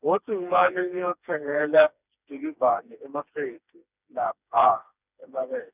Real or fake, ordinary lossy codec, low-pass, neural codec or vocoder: fake; none; 3.6 kHz; codec, 16 kHz, 2 kbps, FreqCodec, smaller model